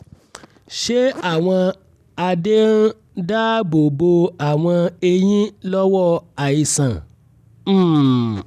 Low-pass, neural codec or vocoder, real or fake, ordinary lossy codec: 14.4 kHz; none; real; none